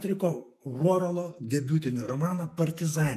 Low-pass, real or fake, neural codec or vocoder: 14.4 kHz; fake; codec, 44.1 kHz, 2.6 kbps, SNAC